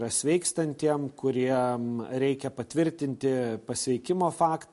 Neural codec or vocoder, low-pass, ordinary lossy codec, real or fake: none; 14.4 kHz; MP3, 48 kbps; real